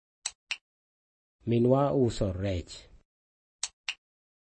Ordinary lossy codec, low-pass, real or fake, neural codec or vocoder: MP3, 32 kbps; 10.8 kHz; real; none